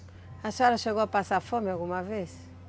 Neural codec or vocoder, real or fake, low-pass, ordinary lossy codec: none; real; none; none